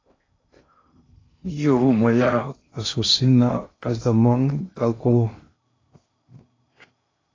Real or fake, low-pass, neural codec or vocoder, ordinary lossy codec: fake; 7.2 kHz; codec, 16 kHz in and 24 kHz out, 0.6 kbps, FocalCodec, streaming, 2048 codes; AAC, 32 kbps